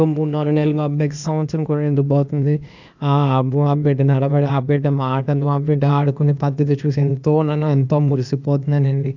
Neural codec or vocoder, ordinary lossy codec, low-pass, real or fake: codec, 16 kHz, 0.8 kbps, ZipCodec; none; 7.2 kHz; fake